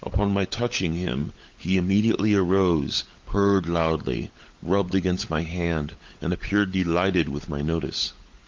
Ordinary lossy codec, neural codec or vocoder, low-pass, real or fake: Opus, 32 kbps; codec, 16 kHz, 16 kbps, FunCodec, trained on LibriTTS, 50 frames a second; 7.2 kHz; fake